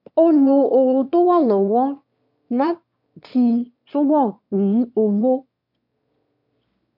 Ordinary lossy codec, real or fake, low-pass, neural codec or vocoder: MP3, 48 kbps; fake; 5.4 kHz; autoencoder, 22.05 kHz, a latent of 192 numbers a frame, VITS, trained on one speaker